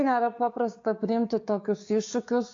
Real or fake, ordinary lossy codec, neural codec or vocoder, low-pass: fake; AAC, 48 kbps; codec, 16 kHz, 6 kbps, DAC; 7.2 kHz